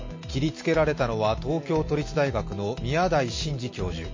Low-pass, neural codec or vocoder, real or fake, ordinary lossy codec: 7.2 kHz; none; real; MP3, 32 kbps